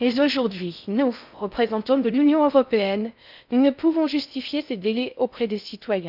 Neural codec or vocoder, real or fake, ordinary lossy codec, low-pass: codec, 16 kHz in and 24 kHz out, 0.6 kbps, FocalCodec, streaming, 4096 codes; fake; none; 5.4 kHz